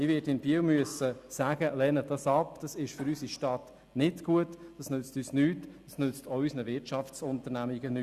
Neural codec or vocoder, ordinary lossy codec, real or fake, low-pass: none; Opus, 64 kbps; real; 14.4 kHz